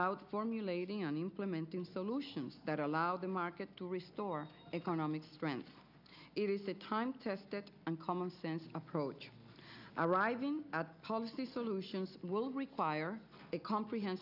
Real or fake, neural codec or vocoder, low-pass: real; none; 5.4 kHz